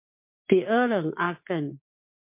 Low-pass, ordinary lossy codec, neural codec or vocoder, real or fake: 3.6 kHz; MP3, 24 kbps; codec, 16 kHz, 6 kbps, DAC; fake